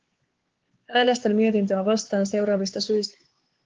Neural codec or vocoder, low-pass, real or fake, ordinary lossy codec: codec, 16 kHz, 4 kbps, X-Codec, HuBERT features, trained on LibriSpeech; 7.2 kHz; fake; Opus, 16 kbps